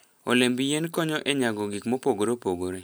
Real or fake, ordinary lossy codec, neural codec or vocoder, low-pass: real; none; none; none